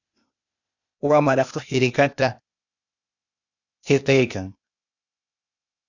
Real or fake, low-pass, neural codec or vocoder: fake; 7.2 kHz; codec, 16 kHz, 0.8 kbps, ZipCodec